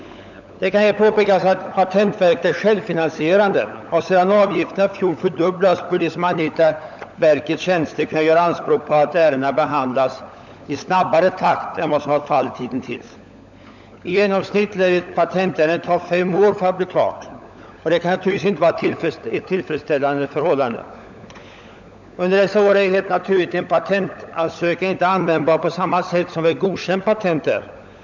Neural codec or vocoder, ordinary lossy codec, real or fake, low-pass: codec, 16 kHz, 16 kbps, FunCodec, trained on LibriTTS, 50 frames a second; none; fake; 7.2 kHz